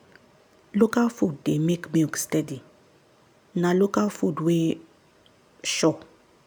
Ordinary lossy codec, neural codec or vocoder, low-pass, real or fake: none; none; none; real